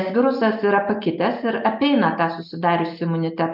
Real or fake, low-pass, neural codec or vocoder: real; 5.4 kHz; none